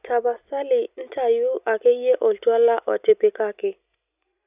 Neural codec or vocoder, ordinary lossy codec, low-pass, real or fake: none; none; 3.6 kHz; real